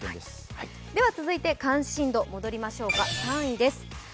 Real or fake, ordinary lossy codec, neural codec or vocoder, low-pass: real; none; none; none